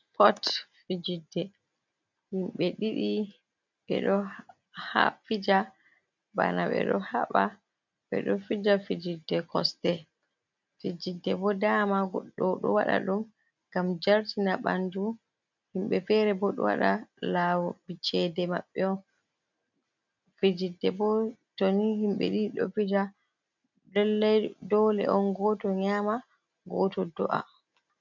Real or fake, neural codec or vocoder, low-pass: real; none; 7.2 kHz